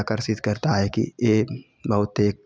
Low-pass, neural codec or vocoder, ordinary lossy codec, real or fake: none; none; none; real